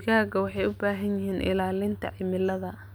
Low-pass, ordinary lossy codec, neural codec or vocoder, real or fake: none; none; none; real